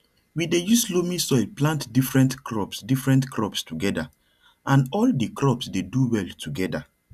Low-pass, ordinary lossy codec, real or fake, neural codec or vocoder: 14.4 kHz; none; real; none